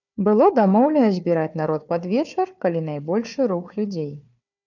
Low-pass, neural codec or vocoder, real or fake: 7.2 kHz; codec, 16 kHz, 4 kbps, FunCodec, trained on Chinese and English, 50 frames a second; fake